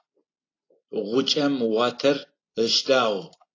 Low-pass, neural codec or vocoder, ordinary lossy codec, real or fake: 7.2 kHz; none; AAC, 32 kbps; real